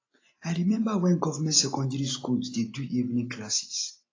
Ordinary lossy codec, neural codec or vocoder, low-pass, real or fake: AAC, 32 kbps; none; 7.2 kHz; real